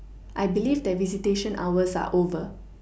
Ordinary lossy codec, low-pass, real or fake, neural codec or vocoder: none; none; real; none